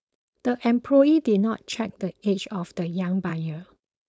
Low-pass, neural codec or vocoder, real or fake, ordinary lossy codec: none; codec, 16 kHz, 4.8 kbps, FACodec; fake; none